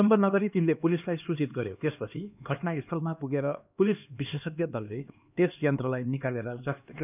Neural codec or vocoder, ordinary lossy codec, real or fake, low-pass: codec, 16 kHz, 2 kbps, X-Codec, HuBERT features, trained on LibriSpeech; none; fake; 3.6 kHz